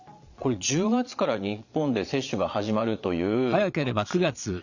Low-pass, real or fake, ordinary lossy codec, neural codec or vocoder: 7.2 kHz; fake; none; vocoder, 22.05 kHz, 80 mel bands, Vocos